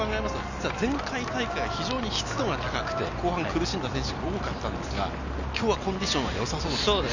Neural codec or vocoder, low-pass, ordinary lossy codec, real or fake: none; 7.2 kHz; none; real